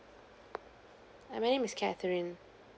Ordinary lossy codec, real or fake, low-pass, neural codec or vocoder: none; real; none; none